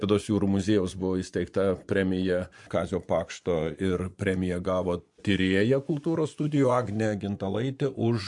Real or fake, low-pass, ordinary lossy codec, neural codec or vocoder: fake; 10.8 kHz; MP3, 64 kbps; vocoder, 24 kHz, 100 mel bands, Vocos